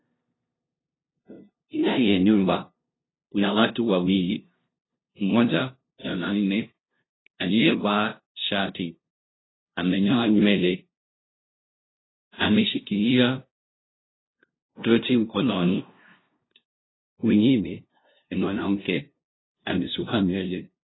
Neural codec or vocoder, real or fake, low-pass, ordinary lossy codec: codec, 16 kHz, 0.5 kbps, FunCodec, trained on LibriTTS, 25 frames a second; fake; 7.2 kHz; AAC, 16 kbps